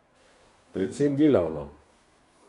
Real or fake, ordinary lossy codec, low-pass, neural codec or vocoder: fake; none; 10.8 kHz; codec, 24 kHz, 1 kbps, SNAC